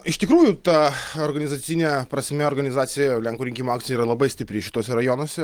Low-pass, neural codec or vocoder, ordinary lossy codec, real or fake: 19.8 kHz; none; Opus, 24 kbps; real